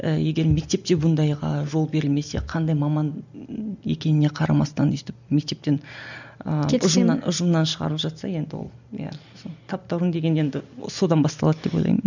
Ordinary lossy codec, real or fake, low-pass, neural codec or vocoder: none; real; 7.2 kHz; none